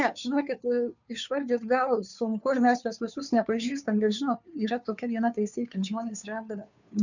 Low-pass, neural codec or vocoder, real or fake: 7.2 kHz; codec, 16 kHz, 2 kbps, FunCodec, trained on Chinese and English, 25 frames a second; fake